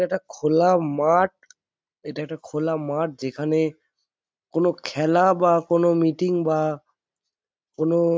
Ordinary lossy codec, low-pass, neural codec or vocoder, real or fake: none; none; none; real